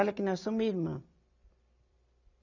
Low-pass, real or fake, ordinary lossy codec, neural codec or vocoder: 7.2 kHz; fake; none; vocoder, 44.1 kHz, 128 mel bands every 256 samples, BigVGAN v2